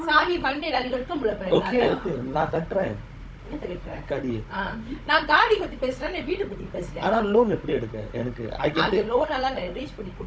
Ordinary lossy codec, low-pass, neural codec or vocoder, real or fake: none; none; codec, 16 kHz, 16 kbps, FunCodec, trained on Chinese and English, 50 frames a second; fake